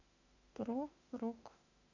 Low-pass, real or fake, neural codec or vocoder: 7.2 kHz; fake; autoencoder, 48 kHz, 32 numbers a frame, DAC-VAE, trained on Japanese speech